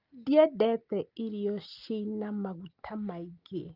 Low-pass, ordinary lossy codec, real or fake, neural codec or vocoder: 5.4 kHz; Opus, 24 kbps; real; none